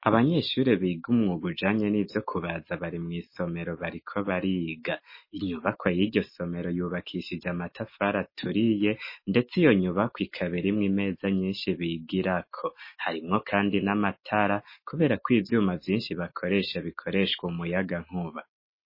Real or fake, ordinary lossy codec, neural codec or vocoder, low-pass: real; MP3, 24 kbps; none; 5.4 kHz